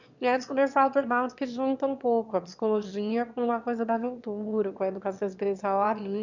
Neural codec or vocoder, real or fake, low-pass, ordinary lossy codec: autoencoder, 22.05 kHz, a latent of 192 numbers a frame, VITS, trained on one speaker; fake; 7.2 kHz; none